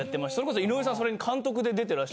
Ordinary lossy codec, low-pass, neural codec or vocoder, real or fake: none; none; none; real